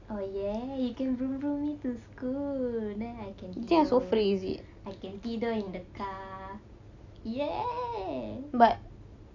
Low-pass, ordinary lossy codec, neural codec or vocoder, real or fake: 7.2 kHz; AAC, 48 kbps; none; real